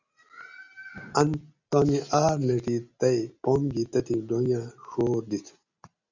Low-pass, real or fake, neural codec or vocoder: 7.2 kHz; real; none